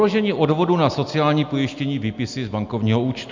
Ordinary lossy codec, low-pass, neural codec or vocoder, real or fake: MP3, 64 kbps; 7.2 kHz; none; real